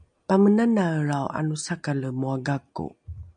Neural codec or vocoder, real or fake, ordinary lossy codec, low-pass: none; real; MP3, 96 kbps; 9.9 kHz